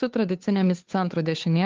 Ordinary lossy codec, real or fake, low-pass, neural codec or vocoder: Opus, 32 kbps; fake; 7.2 kHz; codec, 16 kHz, 2 kbps, FunCodec, trained on Chinese and English, 25 frames a second